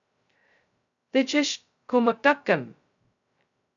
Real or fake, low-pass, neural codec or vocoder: fake; 7.2 kHz; codec, 16 kHz, 0.2 kbps, FocalCodec